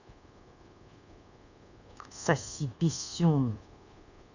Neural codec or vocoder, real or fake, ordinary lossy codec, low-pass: codec, 24 kHz, 1.2 kbps, DualCodec; fake; none; 7.2 kHz